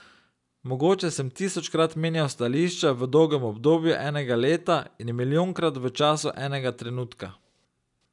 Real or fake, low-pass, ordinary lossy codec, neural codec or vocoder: real; 10.8 kHz; none; none